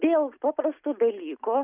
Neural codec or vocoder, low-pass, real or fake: none; 3.6 kHz; real